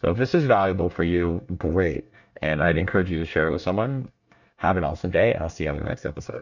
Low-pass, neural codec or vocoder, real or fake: 7.2 kHz; codec, 24 kHz, 1 kbps, SNAC; fake